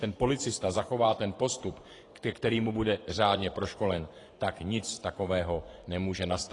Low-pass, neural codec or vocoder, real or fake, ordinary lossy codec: 10.8 kHz; vocoder, 24 kHz, 100 mel bands, Vocos; fake; AAC, 32 kbps